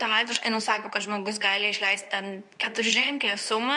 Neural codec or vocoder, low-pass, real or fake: codec, 24 kHz, 0.9 kbps, WavTokenizer, medium speech release version 2; 10.8 kHz; fake